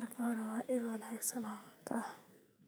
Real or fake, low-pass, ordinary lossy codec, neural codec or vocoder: fake; none; none; codec, 44.1 kHz, 2.6 kbps, SNAC